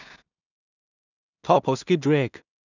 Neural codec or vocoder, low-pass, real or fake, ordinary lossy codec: codec, 16 kHz in and 24 kHz out, 0.4 kbps, LongCat-Audio-Codec, two codebook decoder; 7.2 kHz; fake; none